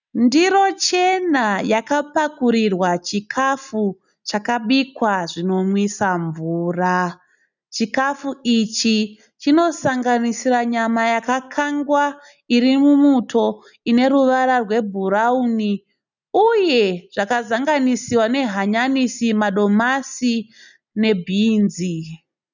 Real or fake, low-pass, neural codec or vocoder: real; 7.2 kHz; none